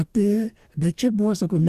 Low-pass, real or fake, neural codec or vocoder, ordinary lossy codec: 14.4 kHz; fake; codec, 44.1 kHz, 2.6 kbps, DAC; Opus, 64 kbps